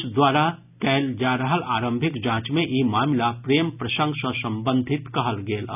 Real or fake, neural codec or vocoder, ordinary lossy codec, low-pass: real; none; none; 3.6 kHz